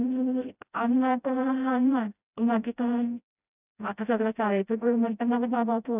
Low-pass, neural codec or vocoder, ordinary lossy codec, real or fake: 3.6 kHz; codec, 16 kHz, 0.5 kbps, FreqCodec, smaller model; none; fake